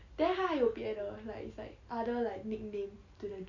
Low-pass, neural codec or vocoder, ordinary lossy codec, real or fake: 7.2 kHz; none; none; real